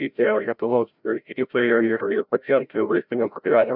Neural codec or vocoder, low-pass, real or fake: codec, 16 kHz, 0.5 kbps, FreqCodec, larger model; 5.4 kHz; fake